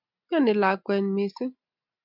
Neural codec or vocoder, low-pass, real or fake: none; 5.4 kHz; real